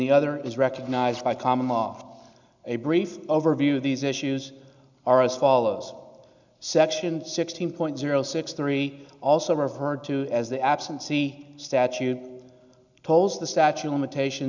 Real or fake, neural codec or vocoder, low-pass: real; none; 7.2 kHz